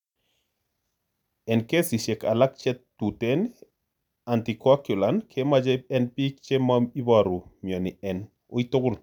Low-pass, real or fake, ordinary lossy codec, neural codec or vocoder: 19.8 kHz; real; none; none